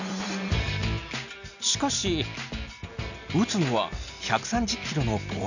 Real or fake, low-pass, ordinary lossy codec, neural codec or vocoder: real; 7.2 kHz; none; none